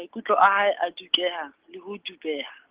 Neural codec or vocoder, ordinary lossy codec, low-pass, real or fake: none; Opus, 16 kbps; 3.6 kHz; real